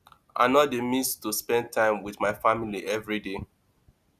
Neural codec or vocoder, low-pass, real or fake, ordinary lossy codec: none; 14.4 kHz; real; none